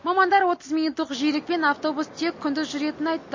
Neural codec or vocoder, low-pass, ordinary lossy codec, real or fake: none; 7.2 kHz; MP3, 32 kbps; real